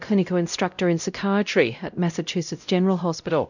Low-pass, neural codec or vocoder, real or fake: 7.2 kHz; codec, 16 kHz, 0.5 kbps, X-Codec, WavLM features, trained on Multilingual LibriSpeech; fake